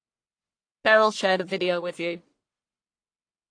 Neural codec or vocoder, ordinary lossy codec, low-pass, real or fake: codec, 44.1 kHz, 1.7 kbps, Pupu-Codec; AAC, 48 kbps; 9.9 kHz; fake